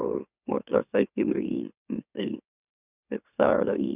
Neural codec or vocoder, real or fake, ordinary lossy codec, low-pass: autoencoder, 44.1 kHz, a latent of 192 numbers a frame, MeloTTS; fake; none; 3.6 kHz